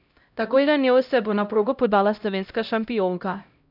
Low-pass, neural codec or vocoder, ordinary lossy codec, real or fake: 5.4 kHz; codec, 16 kHz, 0.5 kbps, X-Codec, HuBERT features, trained on LibriSpeech; none; fake